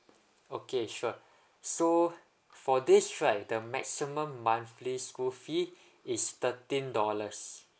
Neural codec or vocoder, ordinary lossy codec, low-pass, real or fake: none; none; none; real